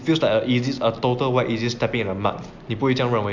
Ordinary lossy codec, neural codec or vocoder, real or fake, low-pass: MP3, 64 kbps; none; real; 7.2 kHz